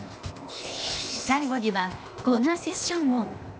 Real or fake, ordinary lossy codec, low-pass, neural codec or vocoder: fake; none; none; codec, 16 kHz, 0.8 kbps, ZipCodec